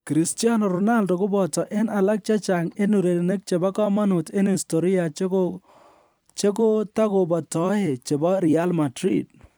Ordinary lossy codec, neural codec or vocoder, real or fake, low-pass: none; vocoder, 44.1 kHz, 128 mel bands every 256 samples, BigVGAN v2; fake; none